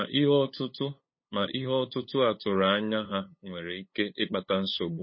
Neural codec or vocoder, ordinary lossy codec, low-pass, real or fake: codec, 16 kHz, 8 kbps, FunCodec, trained on LibriTTS, 25 frames a second; MP3, 24 kbps; 7.2 kHz; fake